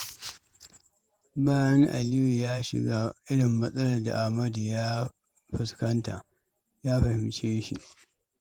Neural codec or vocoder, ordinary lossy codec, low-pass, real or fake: vocoder, 48 kHz, 128 mel bands, Vocos; Opus, 32 kbps; 19.8 kHz; fake